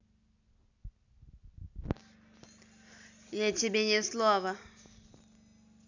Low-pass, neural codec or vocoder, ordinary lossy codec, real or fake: 7.2 kHz; none; none; real